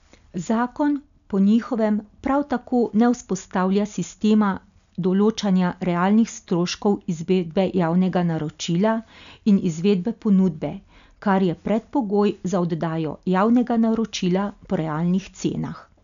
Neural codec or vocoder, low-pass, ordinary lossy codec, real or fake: none; 7.2 kHz; none; real